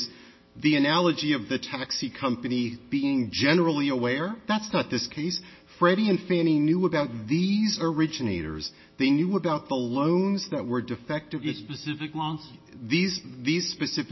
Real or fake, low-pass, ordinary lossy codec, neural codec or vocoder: real; 7.2 kHz; MP3, 24 kbps; none